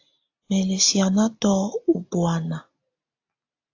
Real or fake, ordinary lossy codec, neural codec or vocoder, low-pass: real; AAC, 48 kbps; none; 7.2 kHz